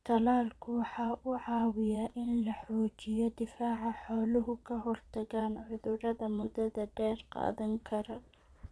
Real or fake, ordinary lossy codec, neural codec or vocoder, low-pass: fake; none; vocoder, 22.05 kHz, 80 mel bands, Vocos; none